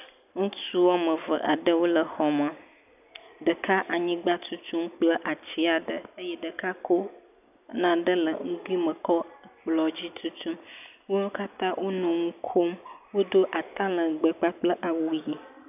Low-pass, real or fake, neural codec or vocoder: 3.6 kHz; real; none